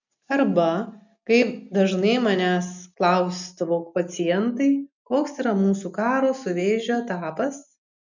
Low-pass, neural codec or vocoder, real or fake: 7.2 kHz; none; real